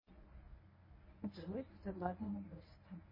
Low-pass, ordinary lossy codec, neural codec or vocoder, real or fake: 5.4 kHz; MP3, 24 kbps; codec, 24 kHz, 0.9 kbps, WavTokenizer, medium speech release version 1; fake